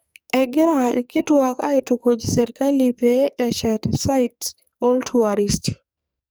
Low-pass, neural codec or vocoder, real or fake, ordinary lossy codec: none; codec, 44.1 kHz, 2.6 kbps, SNAC; fake; none